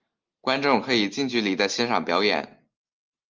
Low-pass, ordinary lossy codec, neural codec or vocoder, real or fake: 7.2 kHz; Opus, 24 kbps; none; real